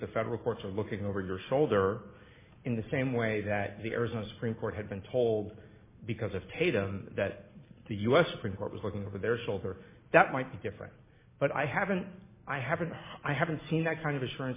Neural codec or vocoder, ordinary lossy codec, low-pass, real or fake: none; MP3, 16 kbps; 3.6 kHz; real